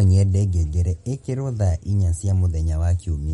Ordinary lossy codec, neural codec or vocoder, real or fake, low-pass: MP3, 48 kbps; none; real; 19.8 kHz